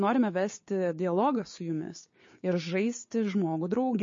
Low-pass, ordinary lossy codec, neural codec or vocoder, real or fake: 7.2 kHz; MP3, 32 kbps; codec, 16 kHz, 6 kbps, DAC; fake